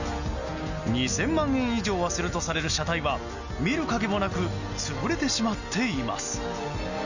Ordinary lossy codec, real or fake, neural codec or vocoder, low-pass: none; real; none; 7.2 kHz